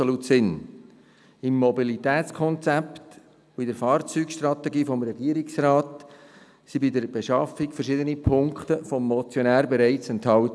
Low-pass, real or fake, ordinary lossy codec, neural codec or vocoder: none; real; none; none